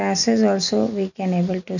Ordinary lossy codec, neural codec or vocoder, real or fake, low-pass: none; none; real; 7.2 kHz